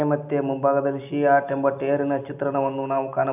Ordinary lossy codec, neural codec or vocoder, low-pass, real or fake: none; none; 3.6 kHz; real